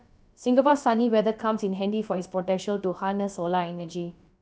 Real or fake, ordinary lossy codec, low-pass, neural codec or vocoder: fake; none; none; codec, 16 kHz, about 1 kbps, DyCAST, with the encoder's durations